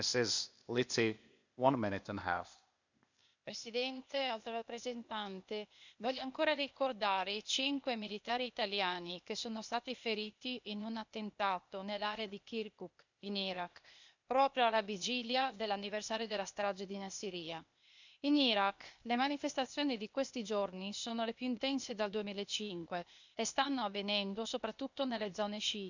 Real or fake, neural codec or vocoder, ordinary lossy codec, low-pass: fake; codec, 16 kHz, 0.8 kbps, ZipCodec; none; 7.2 kHz